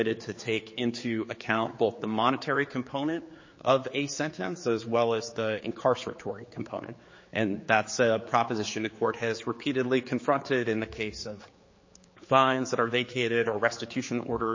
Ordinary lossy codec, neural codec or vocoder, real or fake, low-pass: MP3, 32 kbps; codec, 16 kHz, 4 kbps, X-Codec, HuBERT features, trained on general audio; fake; 7.2 kHz